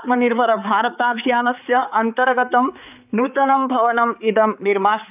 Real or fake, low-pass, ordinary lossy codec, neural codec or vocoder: fake; 3.6 kHz; none; codec, 16 kHz, 4 kbps, X-Codec, HuBERT features, trained on balanced general audio